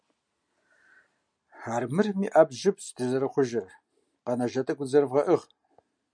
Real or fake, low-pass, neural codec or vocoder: real; 9.9 kHz; none